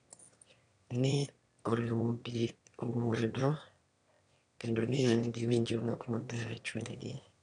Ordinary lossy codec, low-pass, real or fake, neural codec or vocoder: none; 9.9 kHz; fake; autoencoder, 22.05 kHz, a latent of 192 numbers a frame, VITS, trained on one speaker